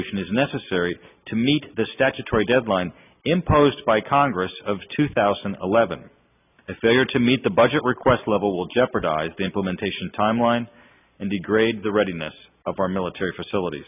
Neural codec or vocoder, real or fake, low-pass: none; real; 3.6 kHz